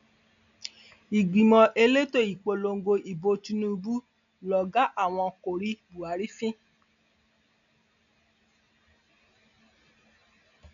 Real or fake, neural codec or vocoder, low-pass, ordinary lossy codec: real; none; 7.2 kHz; none